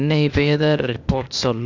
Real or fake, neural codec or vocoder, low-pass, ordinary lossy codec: fake; codec, 16 kHz, 0.8 kbps, ZipCodec; 7.2 kHz; none